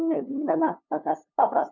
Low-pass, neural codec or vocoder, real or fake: 7.2 kHz; codec, 16 kHz, 2 kbps, FunCodec, trained on LibriTTS, 25 frames a second; fake